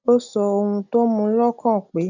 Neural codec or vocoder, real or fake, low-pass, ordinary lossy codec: none; real; 7.2 kHz; none